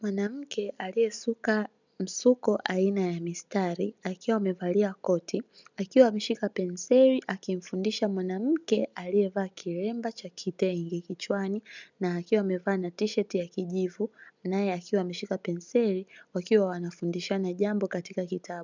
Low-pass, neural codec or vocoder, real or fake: 7.2 kHz; none; real